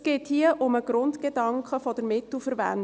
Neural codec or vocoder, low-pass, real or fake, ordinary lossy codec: none; none; real; none